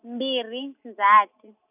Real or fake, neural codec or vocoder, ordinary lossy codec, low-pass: real; none; none; 3.6 kHz